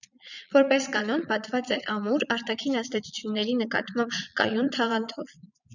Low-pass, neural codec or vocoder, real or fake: 7.2 kHz; vocoder, 22.05 kHz, 80 mel bands, Vocos; fake